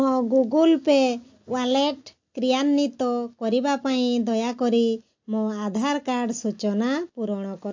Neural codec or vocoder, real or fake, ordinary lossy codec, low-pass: none; real; AAC, 48 kbps; 7.2 kHz